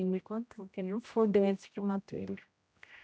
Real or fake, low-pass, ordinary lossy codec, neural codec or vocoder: fake; none; none; codec, 16 kHz, 0.5 kbps, X-Codec, HuBERT features, trained on general audio